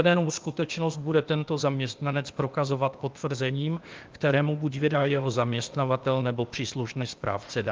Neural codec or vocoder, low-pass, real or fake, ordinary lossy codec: codec, 16 kHz, 0.8 kbps, ZipCodec; 7.2 kHz; fake; Opus, 24 kbps